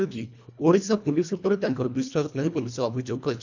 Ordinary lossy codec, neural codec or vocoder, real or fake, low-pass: none; codec, 24 kHz, 1.5 kbps, HILCodec; fake; 7.2 kHz